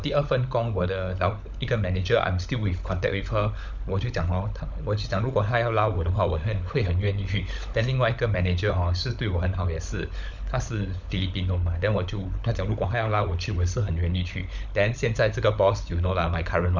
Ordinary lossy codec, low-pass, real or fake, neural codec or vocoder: none; 7.2 kHz; fake; codec, 16 kHz, 16 kbps, FunCodec, trained on LibriTTS, 50 frames a second